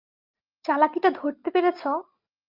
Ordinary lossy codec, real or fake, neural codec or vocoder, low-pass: Opus, 24 kbps; real; none; 5.4 kHz